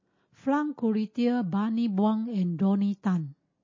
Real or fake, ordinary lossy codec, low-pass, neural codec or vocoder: real; MP3, 32 kbps; 7.2 kHz; none